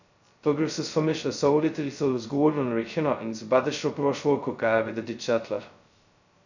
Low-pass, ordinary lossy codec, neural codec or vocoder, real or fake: 7.2 kHz; none; codec, 16 kHz, 0.2 kbps, FocalCodec; fake